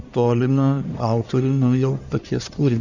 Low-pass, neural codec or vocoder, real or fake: 7.2 kHz; codec, 44.1 kHz, 1.7 kbps, Pupu-Codec; fake